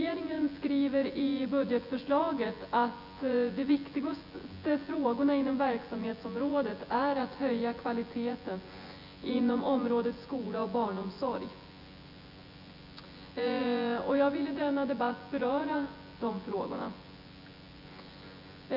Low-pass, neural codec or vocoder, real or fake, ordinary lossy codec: 5.4 kHz; vocoder, 24 kHz, 100 mel bands, Vocos; fake; none